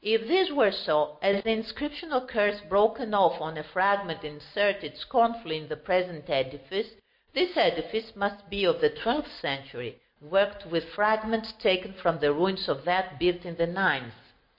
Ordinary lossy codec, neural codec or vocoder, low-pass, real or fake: MP3, 32 kbps; none; 5.4 kHz; real